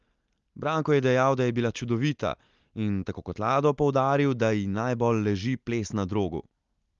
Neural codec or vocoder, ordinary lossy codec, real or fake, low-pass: none; Opus, 32 kbps; real; 7.2 kHz